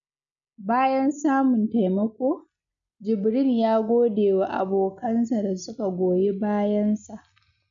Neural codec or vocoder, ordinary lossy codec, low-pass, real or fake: none; none; 7.2 kHz; real